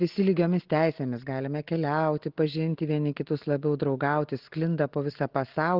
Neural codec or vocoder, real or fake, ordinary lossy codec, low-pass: none; real; Opus, 16 kbps; 5.4 kHz